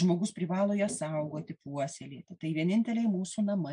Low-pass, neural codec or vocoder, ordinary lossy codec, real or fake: 9.9 kHz; none; MP3, 64 kbps; real